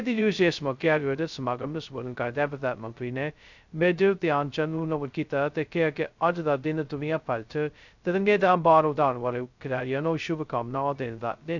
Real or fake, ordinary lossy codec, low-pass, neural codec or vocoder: fake; none; 7.2 kHz; codec, 16 kHz, 0.2 kbps, FocalCodec